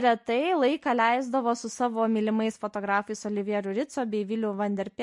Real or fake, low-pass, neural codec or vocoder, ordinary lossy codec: real; 10.8 kHz; none; MP3, 48 kbps